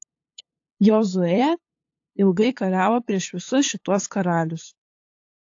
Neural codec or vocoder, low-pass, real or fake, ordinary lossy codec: codec, 16 kHz, 8 kbps, FunCodec, trained on LibriTTS, 25 frames a second; 7.2 kHz; fake; AAC, 48 kbps